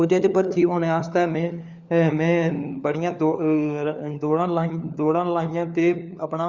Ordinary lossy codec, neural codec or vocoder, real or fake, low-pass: Opus, 64 kbps; codec, 16 kHz, 4 kbps, FunCodec, trained on LibriTTS, 50 frames a second; fake; 7.2 kHz